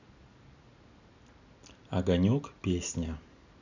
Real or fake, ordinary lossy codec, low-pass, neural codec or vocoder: real; none; 7.2 kHz; none